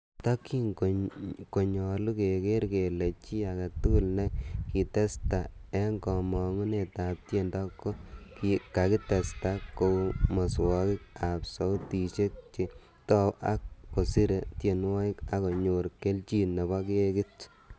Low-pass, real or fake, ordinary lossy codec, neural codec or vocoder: none; real; none; none